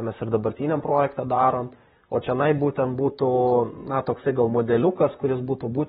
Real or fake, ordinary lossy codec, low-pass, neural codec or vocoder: fake; AAC, 16 kbps; 19.8 kHz; vocoder, 44.1 kHz, 128 mel bands, Pupu-Vocoder